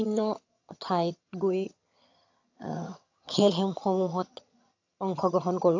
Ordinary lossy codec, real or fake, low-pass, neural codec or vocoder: AAC, 48 kbps; fake; 7.2 kHz; vocoder, 22.05 kHz, 80 mel bands, HiFi-GAN